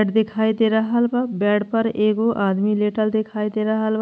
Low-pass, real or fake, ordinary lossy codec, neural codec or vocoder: none; real; none; none